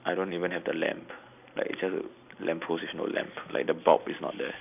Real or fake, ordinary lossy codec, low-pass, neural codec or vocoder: real; none; 3.6 kHz; none